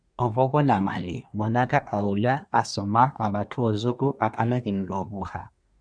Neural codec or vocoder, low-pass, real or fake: codec, 24 kHz, 1 kbps, SNAC; 9.9 kHz; fake